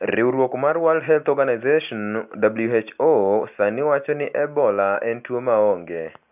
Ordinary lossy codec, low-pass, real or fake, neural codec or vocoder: none; 3.6 kHz; real; none